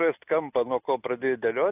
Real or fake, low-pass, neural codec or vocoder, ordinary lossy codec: real; 3.6 kHz; none; AAC, 32 kbps